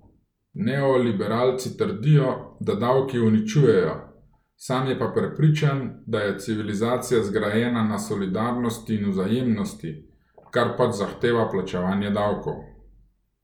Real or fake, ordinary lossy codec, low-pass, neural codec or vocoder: real; none; 19.8 kHz; none